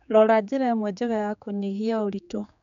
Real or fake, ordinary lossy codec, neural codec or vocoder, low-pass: fake; none; codec, 16 kHz, 4 kbps, X-Codec, HuBERT features, trained on general audio; 7.2 kHz